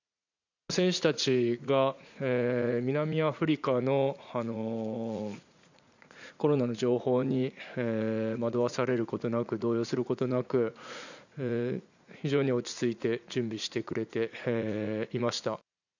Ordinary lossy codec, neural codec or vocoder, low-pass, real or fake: none; vocoder, 44.1 kHz, 80 mel bands, Vocos; 7.2 kHz; fake